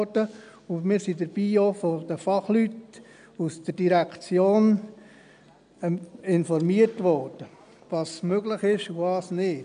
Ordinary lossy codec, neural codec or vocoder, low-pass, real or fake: none; none; 9.9 kHz; real